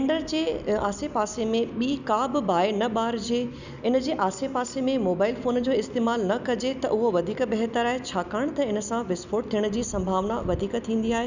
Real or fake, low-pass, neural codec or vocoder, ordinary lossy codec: real; 7.2 kHz; none; none